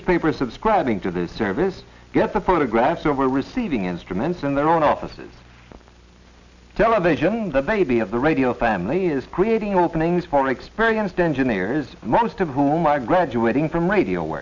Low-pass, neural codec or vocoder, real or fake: 7.2 kHz; none; real